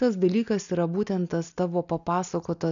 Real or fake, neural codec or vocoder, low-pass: real; none; 7.2 kHz